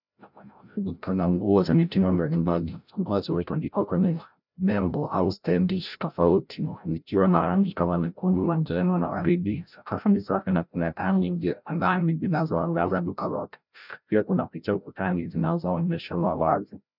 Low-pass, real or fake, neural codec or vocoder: 5.4 kHz; fake; codec, 16 kHz, 0.5 kbps, FreqCodec, larger model